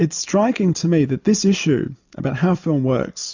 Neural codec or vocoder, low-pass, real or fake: none; 7.2 kHz; real